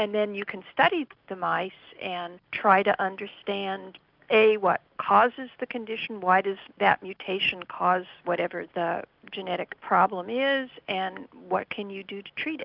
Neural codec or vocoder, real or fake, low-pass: none; real; 5.4 kHz